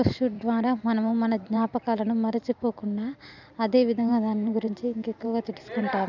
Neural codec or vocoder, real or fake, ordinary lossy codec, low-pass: vocoder, 44.1 kHz, 128 mel bands every 512 samples, BigVGAN v2; fake; none; 7.2 kHz